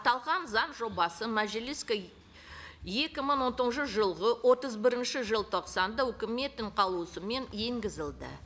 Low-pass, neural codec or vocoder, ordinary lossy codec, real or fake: none; none; none; real